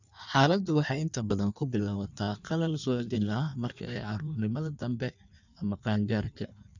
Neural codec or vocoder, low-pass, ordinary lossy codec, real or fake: codec, 16 kHz in and 24 kHz out, 1.1 kbps, FireRedTTS-2 codec; 7.2 kHz; none; fake